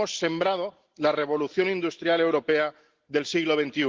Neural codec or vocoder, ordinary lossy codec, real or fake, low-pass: none; Opus, 16 kbps; real; 7.2 kHz